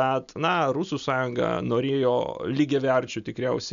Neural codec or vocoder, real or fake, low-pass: none; real; 7.2 kHz